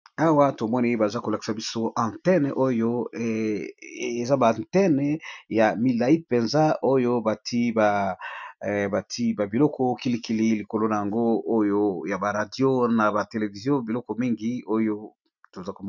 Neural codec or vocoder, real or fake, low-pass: none; real; 7.2 kHz